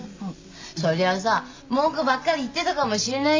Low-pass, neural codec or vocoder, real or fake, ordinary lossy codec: 7.2 kHz; none; real; MP3, 48 kbps